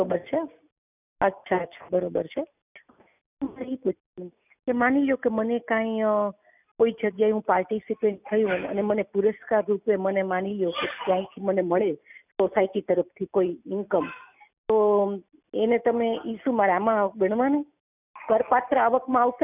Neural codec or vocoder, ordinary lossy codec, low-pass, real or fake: none; none; 3.6 kHz; real